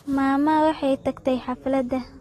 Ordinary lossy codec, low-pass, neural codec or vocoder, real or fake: AAC, 32 kbps; 19.8 kHz; none; real